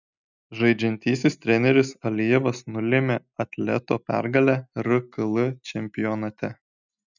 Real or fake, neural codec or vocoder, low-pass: real; none; 7.2 kHz